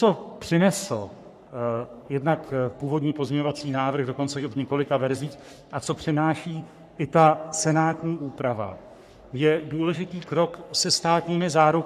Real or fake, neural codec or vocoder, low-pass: fake; codec, 44.1 kHz, 3.4 kbps, Pupu-Codec; 14.4 kHz